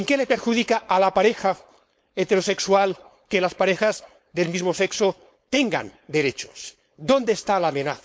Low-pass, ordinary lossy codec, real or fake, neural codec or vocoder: none; none; fake; codec, 16 kHz, 4.8 kbps, FACodec